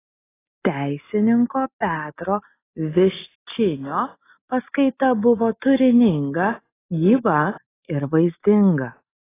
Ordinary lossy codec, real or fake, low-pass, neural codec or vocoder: AAC, 16 kbps; real; 3.6 kHz; none